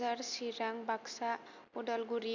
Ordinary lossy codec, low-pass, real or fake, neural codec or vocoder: none; 7.2 kHz; real; none